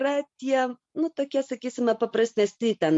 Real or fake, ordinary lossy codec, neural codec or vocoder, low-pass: real; MP3, 48 kbps; none; 10.8 kHz